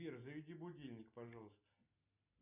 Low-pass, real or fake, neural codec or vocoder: 3.6 kHz; real; none